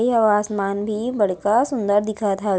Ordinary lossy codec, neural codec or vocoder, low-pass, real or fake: none; none; none; real